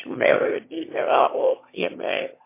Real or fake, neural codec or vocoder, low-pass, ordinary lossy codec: fake; autoencoder, 22.05 kHz, a latent of 192 numbers a frame, VITS, trained on one speaker; 3.6 kHz; MP3, 24 kbps